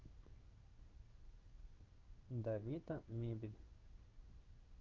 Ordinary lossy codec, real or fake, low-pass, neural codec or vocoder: Opus, 24 kbps; fake; 7.2 kHz; codec, 16 kHz in and 24 kHz out, 1 kbps, XY-Tokenizer